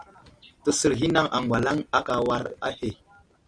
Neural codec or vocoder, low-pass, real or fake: none; 9.9 kHz; real